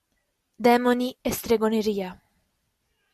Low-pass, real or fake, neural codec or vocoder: 14.4 kHz; real; none